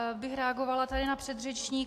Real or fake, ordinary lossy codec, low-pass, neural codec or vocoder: real; AAC, 64 kbps; 14.4 kHz; none